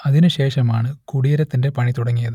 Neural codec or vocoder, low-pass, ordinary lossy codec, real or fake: none; 19.8 kHz; none; real